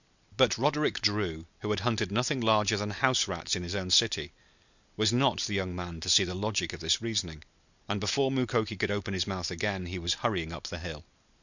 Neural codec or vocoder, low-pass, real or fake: none; 7.2 kHz; real